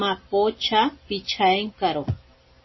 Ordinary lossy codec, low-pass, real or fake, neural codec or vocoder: MP3, 24 kbps; 7.2 kHz; real; none